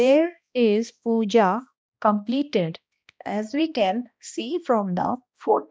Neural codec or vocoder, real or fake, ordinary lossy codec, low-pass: codec, 16 kHz, 1 kbps, X-Codec, HuBERT features, trained on balanced general audio; fake; none; none